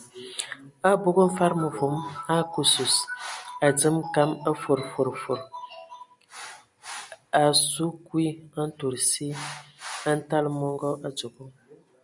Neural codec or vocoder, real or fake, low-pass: none; real; 10.8 kHz